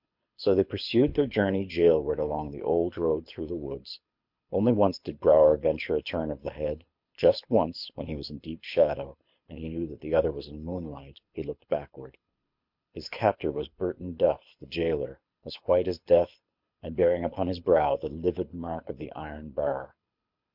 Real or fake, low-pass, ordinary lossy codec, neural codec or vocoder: fake; 5.4 kHz; MP3, 48 kbps; codec, 24 kHz, 6 kbps, HILCodec